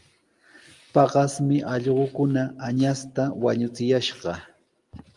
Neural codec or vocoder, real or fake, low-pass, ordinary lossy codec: none; real; 10.8 kHz; Opus, 32 kbps